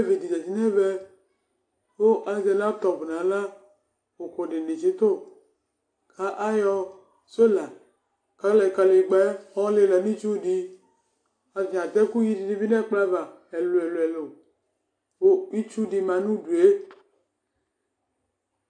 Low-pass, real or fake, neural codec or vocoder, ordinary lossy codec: 9.9 kHz; real; none; AAC, 48 kbps